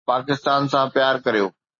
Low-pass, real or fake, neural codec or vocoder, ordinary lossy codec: 7.2 kHz; real; none; MP3, 32 kbps